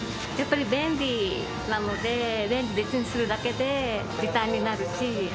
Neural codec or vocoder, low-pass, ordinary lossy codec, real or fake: none; none; none; real